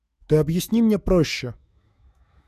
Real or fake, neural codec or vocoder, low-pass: fake; autoencoder, 48 kHz, 128 numbers a frame, DAC-VAE, trained on Japanese speech; 14.4 kHz